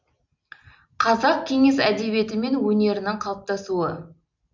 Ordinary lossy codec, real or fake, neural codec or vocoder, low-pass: MP3, 64 kbps; real; none; 7.2 kHz